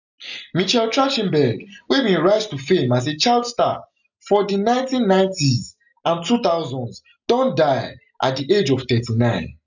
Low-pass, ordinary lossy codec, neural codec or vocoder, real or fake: 7.2 kHz; none; none; real